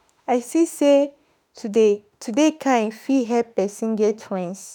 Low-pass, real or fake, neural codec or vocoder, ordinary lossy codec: none; fake; autoencoder, 48 kHz, 32 numbers a frame, DAC-VAE, trained on Japanese speech; none